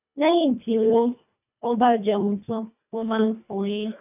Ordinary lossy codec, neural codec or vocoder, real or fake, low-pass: none; codec, 24 kHz, 1.5 kbps, HILCodec; fake; 3.6 kHz